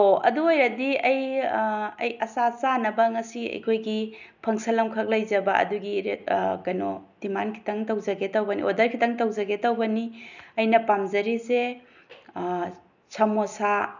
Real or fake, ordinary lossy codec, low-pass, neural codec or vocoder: real; none; 7.2 kHz; none